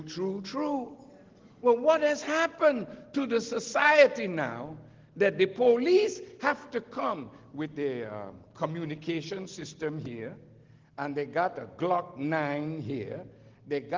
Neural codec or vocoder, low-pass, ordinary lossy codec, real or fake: none; 7.2 kHz; Opus, 16 kbps; real